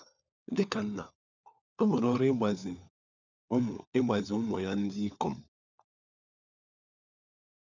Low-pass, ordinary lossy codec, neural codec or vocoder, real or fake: 7.2 kHz; none; codec, 16 kHz, 4 kbps, FunCodec, trained on LibriTTS, 50 frames a second; fake